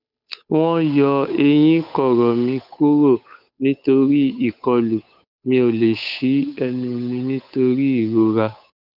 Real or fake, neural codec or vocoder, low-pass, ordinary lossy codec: fake; codec, 16 kHz, 8 kbps, FunCodec, trained on Chinese and English, 25 frames a second; 5.4 kHz; none